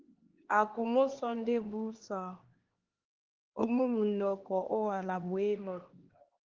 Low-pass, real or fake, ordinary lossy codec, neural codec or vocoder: 7.2 kHz; fake; Opus, 16 kbps; codec, 16 kHz, 2 kbps, X-Codec, HuBERT features, trained on LibriSpeech